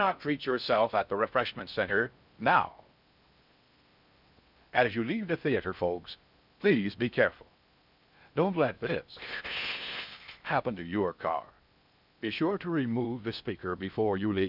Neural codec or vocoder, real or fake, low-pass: codec, 16 kHz in and 24 kHz out, 0.6 kbps, FocalCodec, streaming, 4096 codes; fake; 5.4 kHz